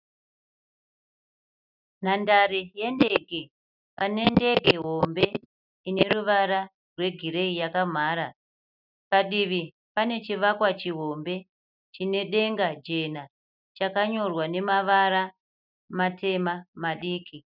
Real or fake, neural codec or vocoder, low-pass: real; none; 5.4 kHz